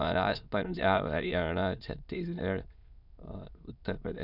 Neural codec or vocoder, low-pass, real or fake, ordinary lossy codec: autoencoder, 22.05 kHz, a latent of 192 numbers a frame, VITS, trained on many speakers; 5.4 kHz; fake; none